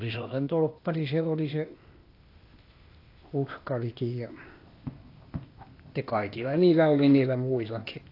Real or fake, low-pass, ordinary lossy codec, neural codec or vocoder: fake; 5.4 kHz; AAC, 32 kbps; codec, 16 kHz, 0.8 kbps, ZipCodec